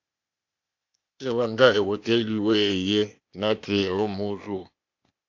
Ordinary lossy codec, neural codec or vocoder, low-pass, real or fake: AAC, 48 kbps; codec, 16 kHz, 0.8 kbps, ZipCodec; 7.2 kHz; fake